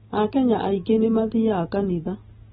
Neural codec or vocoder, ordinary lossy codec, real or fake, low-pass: none; AAC, 16 kbps; real; 10.8 kHz